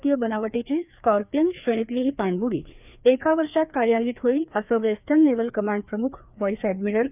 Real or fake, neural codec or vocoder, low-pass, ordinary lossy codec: fake; codec, 16 kHz, 2 kbps, FreqCodec, larger model; 3.6 kHz; none